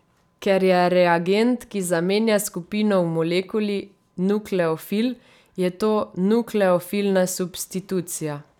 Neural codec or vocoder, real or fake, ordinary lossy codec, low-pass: vocoder, 44.1 kHz, 128 mel bands every 256 samples, BigVGAN v2; fake; none; 19.8 kHz